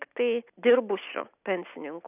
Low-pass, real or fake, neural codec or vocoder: 3.6 kHz; real; none